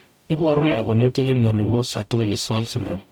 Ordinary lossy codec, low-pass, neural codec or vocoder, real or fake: none; 19.8 kHz; codec, 44.1 kHz, 0.9 kbps, DAC; fake